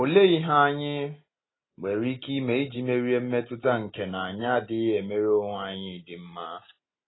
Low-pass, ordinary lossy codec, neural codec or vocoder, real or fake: 7.2 kHz; AAC, 16 kbps; none; real